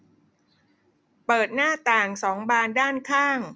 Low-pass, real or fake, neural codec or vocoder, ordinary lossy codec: none; real; none; none